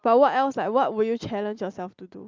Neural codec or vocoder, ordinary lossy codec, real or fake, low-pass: none; Opus, 32 kbps; real; 7.2 kHz